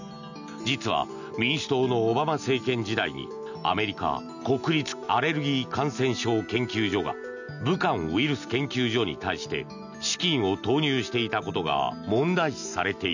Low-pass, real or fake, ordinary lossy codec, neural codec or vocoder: 7.2 kHz; real; none; none